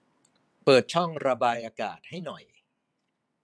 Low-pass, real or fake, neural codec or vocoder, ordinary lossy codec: none; fake; vocoder, 22.05 kHz, 80 mel bands, WaveNeXt; none